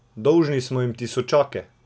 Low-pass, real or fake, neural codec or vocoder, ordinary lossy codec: none; real; none; none